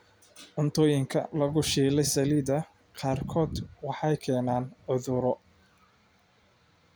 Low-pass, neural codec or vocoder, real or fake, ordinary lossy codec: none; none; real; none